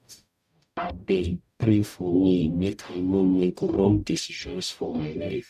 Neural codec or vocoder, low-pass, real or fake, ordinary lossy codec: codec, 44.1 kHz, 0.9 kbps, DAC; 14.4 kHz; fake; none